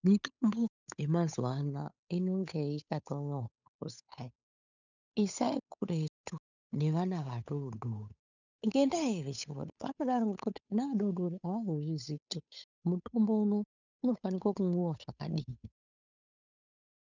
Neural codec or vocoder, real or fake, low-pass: codec, 16 kHz, 8 kbps, FunCodec, trained on Chinese and English, 25 frames a second; fake; 7.2 kHz